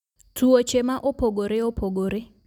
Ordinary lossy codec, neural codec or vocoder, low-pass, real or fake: none; none; 19.8 kHz; real